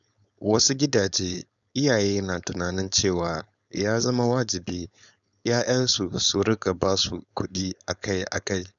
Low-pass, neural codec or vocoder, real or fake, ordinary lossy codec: 7.2 kHz; codec, 16 kHz, 4.8 kbps, FACodec; fake; none